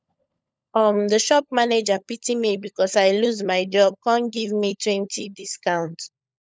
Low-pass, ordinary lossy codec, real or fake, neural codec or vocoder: none; none; fake; codec, 16 kHz, 16 kbps, FunCodec, trained on LibriTTS, 50 frames a second